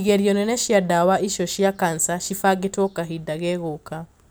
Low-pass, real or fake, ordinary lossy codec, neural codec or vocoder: none; real; none; none